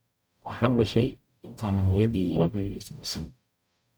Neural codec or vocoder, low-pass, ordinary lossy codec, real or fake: codec, 44.1 kHz, 0.9 kbps, DAC; none; none; fake